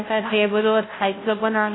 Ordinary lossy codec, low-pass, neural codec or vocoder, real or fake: AAC, 16 kbps; 7.2 kHz; codec, 16 kHz, 0.5 kbps, FunCodec, trained on Chinese and English, 25 frames a second; fake